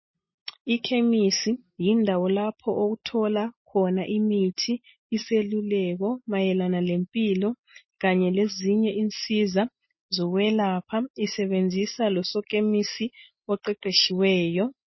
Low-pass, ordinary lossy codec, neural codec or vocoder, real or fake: 7.2 kHz; MP3, 24 kbps; none; real